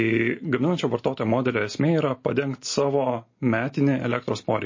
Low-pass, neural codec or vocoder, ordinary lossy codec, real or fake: 7.2 kHz; none; MP3, 32 kbps; real